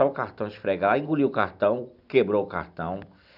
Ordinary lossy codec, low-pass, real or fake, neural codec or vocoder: none; 5.4 kHz; real; none